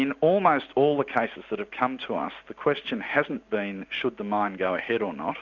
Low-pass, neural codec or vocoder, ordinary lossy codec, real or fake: 7.2 kHz; none; Opus, 64 kbps; real